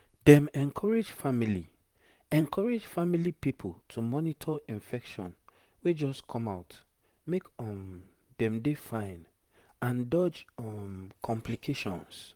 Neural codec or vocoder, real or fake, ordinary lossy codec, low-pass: vocoder, 44.1 kHz, 128 mel bands, Pupu-Vocoder; fake; Opus, 32 kbps; 19.8 kHz